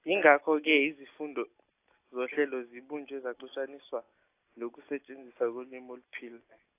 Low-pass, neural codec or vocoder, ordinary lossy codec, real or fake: 3.6 kHz; none; AAC, 24 kbps; real